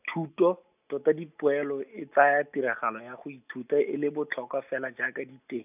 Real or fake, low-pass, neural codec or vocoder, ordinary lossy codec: real; 3.6 kHz; none; none